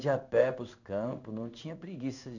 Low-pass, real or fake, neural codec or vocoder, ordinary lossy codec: 7.2 kHz; fake; codec, 16 kHz in and 24 kHz out, 1 kbps, XY-Tokenizer; none